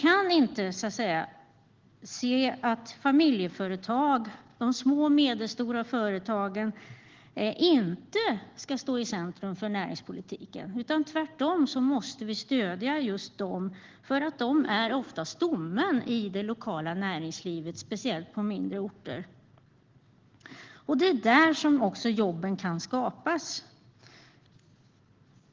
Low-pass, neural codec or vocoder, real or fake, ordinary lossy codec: 7.2 kHz; none; real; Opus, 16 kbps